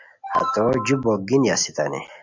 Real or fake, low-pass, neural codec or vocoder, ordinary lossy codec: real; 7.2 kHz; none; MP3, 64 kbps